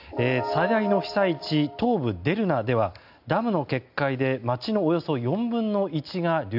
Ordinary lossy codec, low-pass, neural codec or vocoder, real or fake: AAC, 48 kbps; 5.4 kHz; none; real